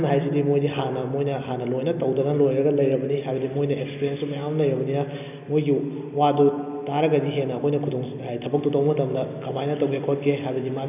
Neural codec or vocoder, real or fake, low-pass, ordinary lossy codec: none; real; 3.6 kHz; none